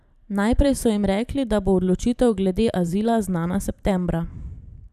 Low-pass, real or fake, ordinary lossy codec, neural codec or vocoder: 14.4 kHz; fake; none; vocoder, 44.1 kHz, 128 mel bands every 512 samples, BigVGAN v2